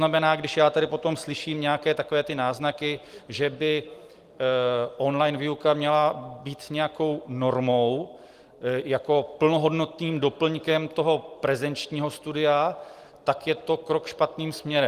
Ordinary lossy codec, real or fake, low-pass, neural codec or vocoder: Opus, 24 kbps; real; 14.4 kHz; none